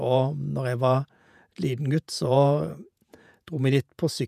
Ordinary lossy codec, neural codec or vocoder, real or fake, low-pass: none; vocoder, 48 kHz, 128 mel bands, Vocos; fake; 14.4 kHz